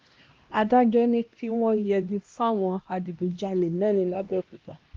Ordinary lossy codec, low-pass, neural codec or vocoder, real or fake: Opus, 32 kbps; 7.2 kHz; codec, 16 kHz, 1 kbps, X-Codec, HuBERT features, trained on LibriSpeech; fake